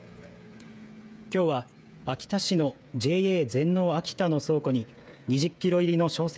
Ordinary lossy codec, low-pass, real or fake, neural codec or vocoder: none; none; fake; codec, 16 kHz, 8 kbps, FreqCodec, smaller model